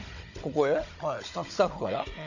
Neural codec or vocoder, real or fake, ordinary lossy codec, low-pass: codec, 16 kHz, 16 kbps, FunCodec, trained on Chinese and English, 50 frames a second; fake; none; 7.2 kHz